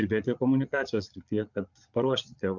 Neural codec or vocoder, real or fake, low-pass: vocoder, 22.05 kHz, 80 mel bands, Vocos; fake; 7.2 kHz